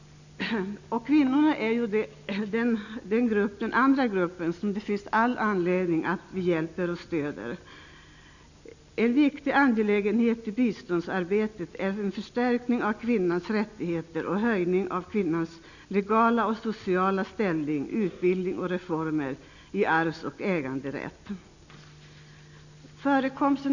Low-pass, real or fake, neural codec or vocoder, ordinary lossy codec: 7.2 kHz; real; none; none